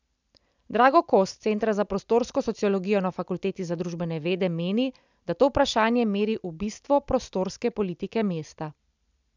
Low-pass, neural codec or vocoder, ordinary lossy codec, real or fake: 7.2 kHz; none; none; real